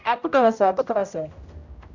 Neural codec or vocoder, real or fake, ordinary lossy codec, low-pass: codec, 16 kHz, 0.5 kbps, X-Codec, HuBERT features, trained on general audio; fake; none; 7.2 kHz